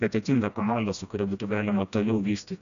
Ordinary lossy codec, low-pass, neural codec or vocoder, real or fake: MP3, 96 kbps; 7.2 kHz; codec, 16 kHz, 1 kbps, FreqCodec, smaller model; fake